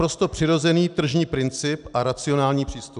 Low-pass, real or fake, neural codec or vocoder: 10.8 kHz; real; none